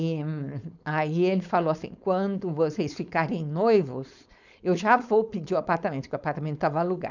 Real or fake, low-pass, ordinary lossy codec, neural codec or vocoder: fake; 7.2 kHz; none; codec, 16 kHz, 4.8 kbps, FACodec